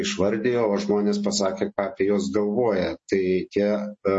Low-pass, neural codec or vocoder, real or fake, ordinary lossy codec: 10.8 kHz; none; real; MP3, 32 kbps